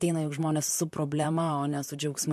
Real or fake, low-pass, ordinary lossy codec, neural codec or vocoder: fake; 14.4 kHz; MP3, 64 kbps; vocoder, 44.1 kHz, 128 mel bands, Pupu-Vocoder